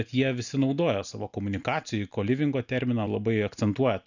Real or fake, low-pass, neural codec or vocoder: real; 7.2 kHz; none